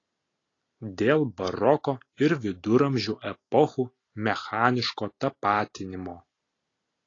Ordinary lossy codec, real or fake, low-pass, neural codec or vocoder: AAC, 32 kbps; real; 7.2 kHz; none